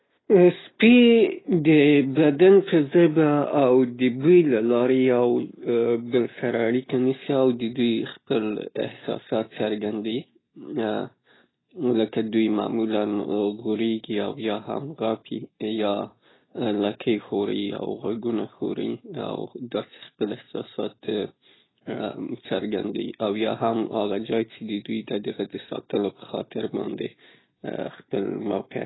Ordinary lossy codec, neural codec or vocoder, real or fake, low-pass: AAC, 16 kbps; none; real; 7.2 kHz